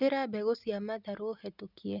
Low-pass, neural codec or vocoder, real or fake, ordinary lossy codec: 5.4 kHz; none; real; none